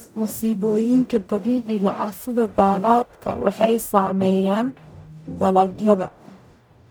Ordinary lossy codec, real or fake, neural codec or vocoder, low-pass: none; fake; codec, 44.1 kHz, 0.9 kbps, DAC; none